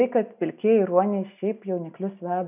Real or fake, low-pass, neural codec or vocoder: real; 3.6 kHz; none